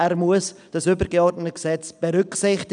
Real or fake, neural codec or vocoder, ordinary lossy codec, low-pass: real; none; none; 9.9 kHz